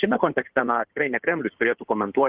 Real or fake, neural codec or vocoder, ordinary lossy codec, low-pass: fake; codec, 16 kHz in and 24 kHz out, 2.2 kbps, FireRedTTS-2 codec; Opus, 16 kbps; 3.6 kHz